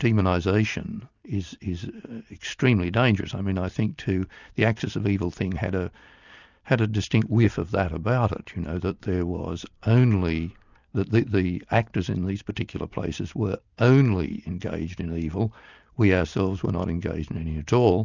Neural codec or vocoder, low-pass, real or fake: none; 7.2 kHz; real